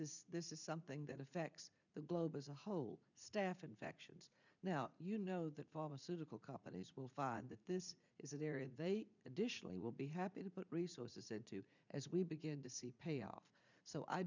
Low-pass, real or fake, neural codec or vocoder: 7.2 kHz; fake; vocoder, 44.1 kHz, 80 mel bands, Vocos